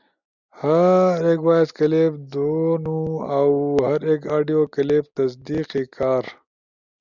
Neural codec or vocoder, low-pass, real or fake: none; 7.2 kHz; real